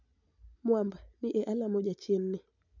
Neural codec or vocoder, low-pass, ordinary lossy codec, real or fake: none; 7.2 kHz; none; real